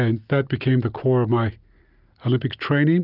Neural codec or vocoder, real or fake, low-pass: none; real; 5.4 kHz